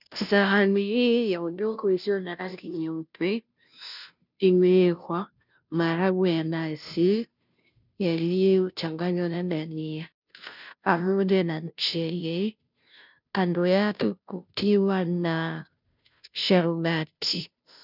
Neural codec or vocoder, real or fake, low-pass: codec, 16 kHz, 0.5 kbps, FunCodec, trained on Chinese and English, 25 frames a second; fake; 5.4 kHz